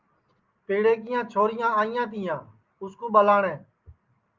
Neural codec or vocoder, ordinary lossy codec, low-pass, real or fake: none; Opus, 24 kbps; 7.2 kHz; real